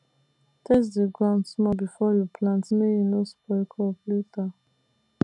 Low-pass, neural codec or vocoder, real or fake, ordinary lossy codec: 10.8 kHz; none; real; none